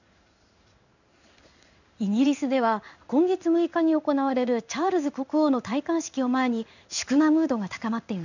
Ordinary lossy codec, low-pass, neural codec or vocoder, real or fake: none; 7.2 kHz; codec, 16 kHz in and 24 kHz out, 1 kbps, XY-Tokenizer; fake